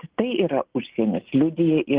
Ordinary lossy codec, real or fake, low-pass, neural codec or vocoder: Opus, 16 kbps; real; 3.6 kHz; none